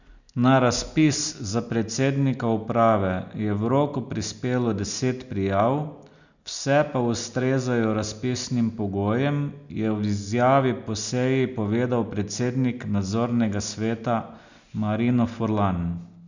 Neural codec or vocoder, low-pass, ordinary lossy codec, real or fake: none; 7.2 kHz; none; real